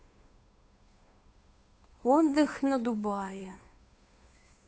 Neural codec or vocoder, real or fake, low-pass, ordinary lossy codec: codec, 16 kHz, 2 kbps, FunCodec, trained on Chinese and English, 25 frames a second; fake; none; none